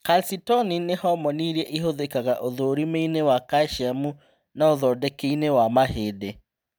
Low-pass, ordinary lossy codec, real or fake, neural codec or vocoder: none; none; real; none